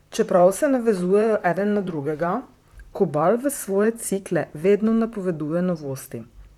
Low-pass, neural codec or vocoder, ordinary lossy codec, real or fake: 19.8 kHz; vocoder, 44.1 kHz, 128 mel bands, Pupu-Vocoder; none; fake